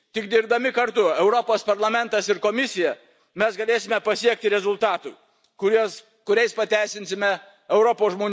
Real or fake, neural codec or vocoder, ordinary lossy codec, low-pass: real; none; none; none